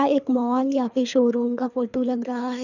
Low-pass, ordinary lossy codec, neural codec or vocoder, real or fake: 7.2 kHz; none; codec, 24 kHz, 3 kbps, HILCodec; fake